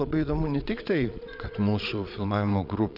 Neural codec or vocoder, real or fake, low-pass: vocoder, 22.05 kHz, 80 mel bands, Vocos; fake; 5.4 kHz